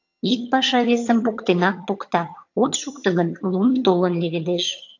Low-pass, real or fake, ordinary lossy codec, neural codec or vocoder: 7.2 kHz; fake; AAC, 48 kbps; vocoder, 22.05 kHz, 80 mel bands, HiFi-GAN